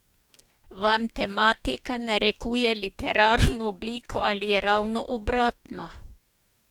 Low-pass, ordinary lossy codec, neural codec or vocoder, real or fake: 19.8 kHz; none; codec, 44.1 kHz, 2.6 kbps, DAC; fake